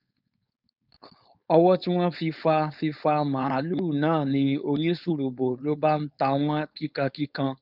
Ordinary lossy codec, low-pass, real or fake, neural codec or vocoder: none; 5.4 kHz; fake; codec, 16 kHz, 4.8 kbps, FACodec